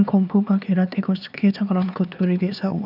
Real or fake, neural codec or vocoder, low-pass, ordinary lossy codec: fake; codec, 16 kHz, 4 kbps, X-Codec, HuBERT features, trained on LibriSpeech; 5.4 kHz; none